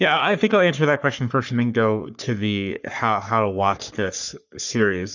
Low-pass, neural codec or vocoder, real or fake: 7.2 kHz; codec, 44.1 kHz, 3.4 kbps, Pupu-Codec; fake